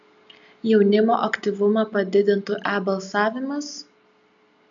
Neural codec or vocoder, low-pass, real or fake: none; 7.2 kHz; real